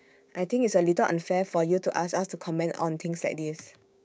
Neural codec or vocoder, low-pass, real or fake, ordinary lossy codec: codec, 16 kHz, 6 kbps, DAC; none; fake; none